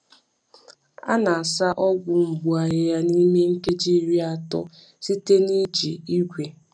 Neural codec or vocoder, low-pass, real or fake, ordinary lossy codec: none; 9.9 kHz; real; none